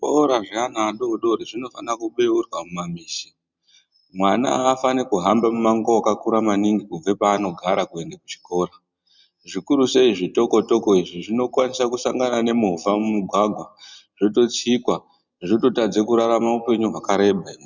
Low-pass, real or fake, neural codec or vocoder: 7.2 kHz; real; none